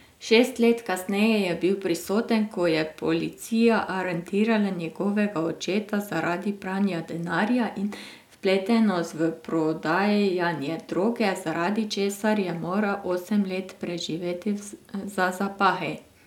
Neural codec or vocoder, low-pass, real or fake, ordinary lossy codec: none; 19.8 kHz; real; none